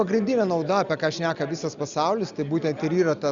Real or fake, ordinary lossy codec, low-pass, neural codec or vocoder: real; Opus, 64 kbps; 7.2 kHz; none